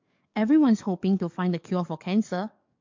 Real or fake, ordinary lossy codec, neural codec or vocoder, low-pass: fake; MP3, 48 kbps; codec, 44.1 kHz, 7.8 kbps, DAC; 7.2 kHz